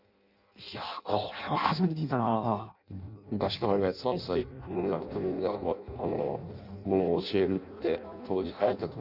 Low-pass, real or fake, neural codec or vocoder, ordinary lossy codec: 5.4 kHz; fake; codec, 16 kHz in and 24 kHz out, 0.6 kbps, FireRedTTS-2 codec; none